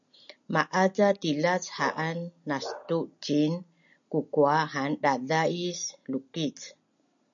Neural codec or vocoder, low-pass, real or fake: none; 7.2 kHz; real